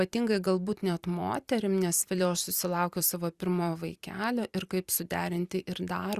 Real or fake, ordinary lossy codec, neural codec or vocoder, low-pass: fake; Opus, 64 kbps; vocoder, 44.1 kHz, 128 mel bands every 256 samples, BigVGAN v2; 14.4 kHz